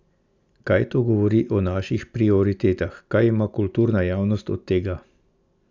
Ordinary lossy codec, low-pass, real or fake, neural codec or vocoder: Opus, 64 kbps; 7.2 kHz; real; none